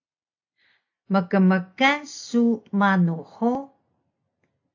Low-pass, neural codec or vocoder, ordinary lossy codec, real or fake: 7.2 kHz; none; AAC, 48 kbps; real